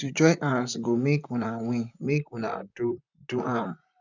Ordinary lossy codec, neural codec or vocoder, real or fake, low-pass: none; vocoder, 44.1 kHz, 128 mel bands, Pupu-Vocoder; fake; 7.2 kHz